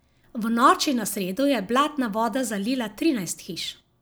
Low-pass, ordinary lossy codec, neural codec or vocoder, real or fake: none; none; none; real